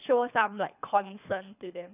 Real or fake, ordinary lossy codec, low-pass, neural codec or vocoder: fake; none; 3.6 kHz; codec, 24 kHz, 3 kbps, HILCodec